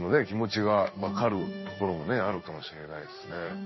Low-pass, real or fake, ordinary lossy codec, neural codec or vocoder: 7.2 kHz; fake; MP3, 24 kbps; codec, 16 kHz in and 24 kHz out, 1 kbps, XY-Tokenizer